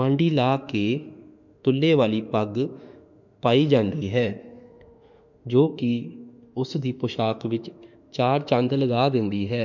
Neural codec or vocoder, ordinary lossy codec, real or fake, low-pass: autoencoder, 48 kHz, 32 numbers a frame, DAC-VAE, trained on Japanese speech; none; fake; 7.2 kHz